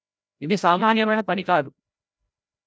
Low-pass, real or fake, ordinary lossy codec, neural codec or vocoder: none; fake; none; codec, 16 kHz, 0.5 kbps, FreqCodec, larger model